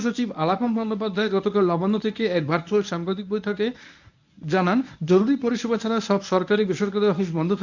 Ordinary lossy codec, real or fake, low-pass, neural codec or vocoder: none; fake; 7.2 kHz; codec, 24 kHz, 0.9 kbps, WavTokenizer, medium speech release version 1